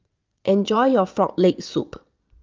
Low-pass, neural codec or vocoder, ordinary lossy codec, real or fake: 7.2 kHz; vocoder, 22.05 kHz, 80 mel bands, Vocos; Opus, 24 kbps; fake